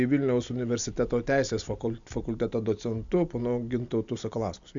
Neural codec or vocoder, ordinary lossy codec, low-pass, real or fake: none; MP3, 64 kbps; 7.2 kHz; real